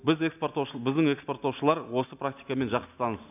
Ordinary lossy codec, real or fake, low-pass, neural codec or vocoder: none; real; 3.6 kHz; none